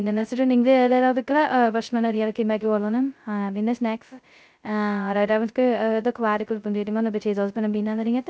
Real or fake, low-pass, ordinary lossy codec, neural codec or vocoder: fake; none; none; codec, 16 kHz, 0.2 kbps, FocalCodec